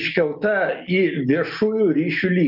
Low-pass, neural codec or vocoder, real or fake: 5.4 kHz; none; real